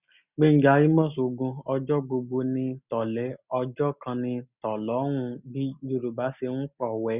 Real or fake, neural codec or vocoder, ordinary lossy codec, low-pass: real; none; none; 3.6 kHz